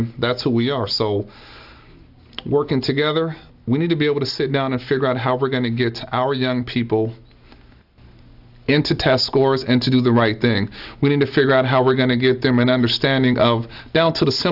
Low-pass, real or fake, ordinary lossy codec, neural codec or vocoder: 5.4 kHz; real; AAC, 48 kbps; none